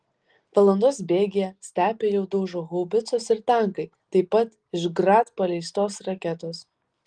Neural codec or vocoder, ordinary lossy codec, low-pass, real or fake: none; Opus, 24 kbps; 9.9 kHz; real